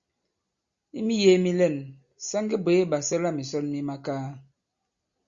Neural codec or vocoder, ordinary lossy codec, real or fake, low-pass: none; Opus, 64 kbps; real; 7.2 kHz